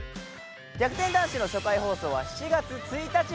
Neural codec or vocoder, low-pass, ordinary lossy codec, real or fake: none; none; none; real